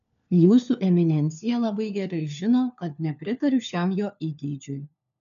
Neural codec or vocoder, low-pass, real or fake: codec, 16 kHz, 4 kbps, FunCodec, trained on LibriTTS, 50 frames a second; 7.2 kHz; fake